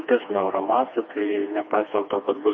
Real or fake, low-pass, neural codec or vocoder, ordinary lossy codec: fake; 7.2 kHz; codec, 16 kHz, 2 kbps, FreqCodec, smaller model; MP3, 32 kbps